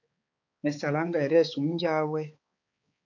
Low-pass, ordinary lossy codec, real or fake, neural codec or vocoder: 7.2 kHz; AAC, 48 kbps; fake; codec, 16 kHz, 4 kbps, X-Codec, HuBERT features, trained on balanced general audio